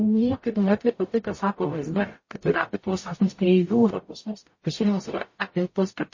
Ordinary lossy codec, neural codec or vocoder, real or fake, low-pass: MP3, 32 kbps; codec, 44.1 kHz, 0.9 kbps, DAC; fake; 7.2 kHz